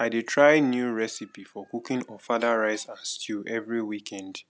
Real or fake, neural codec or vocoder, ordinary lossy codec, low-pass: real; none; none; none